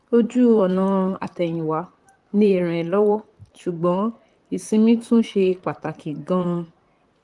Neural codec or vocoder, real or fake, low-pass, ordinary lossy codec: vocoder, 44.1 kHz, 128 mel bands, Pupu-Vocoder; fake; 10.8 kHz; Opus, 32 kbps